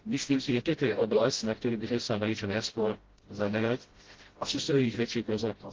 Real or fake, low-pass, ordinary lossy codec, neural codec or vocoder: fake; 7.2 kHz; Opus, 16 kbps; codec, 16 kHz, 0.5 kbps, FreqCodec, smaller model